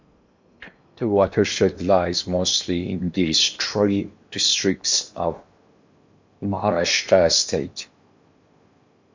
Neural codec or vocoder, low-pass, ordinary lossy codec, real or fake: codec, 16 kHz in and 24 kHz out, 0.8 kbps, FocalCodec, streaming, 65536 codes; 7.2 kHz; MP3, 48 kbps; fake